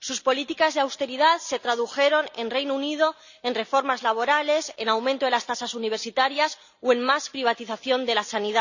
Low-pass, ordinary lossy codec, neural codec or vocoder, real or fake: 7.2 kHz; none; none; real